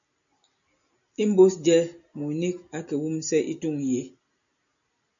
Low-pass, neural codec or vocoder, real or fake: 7.2 kHz; none; real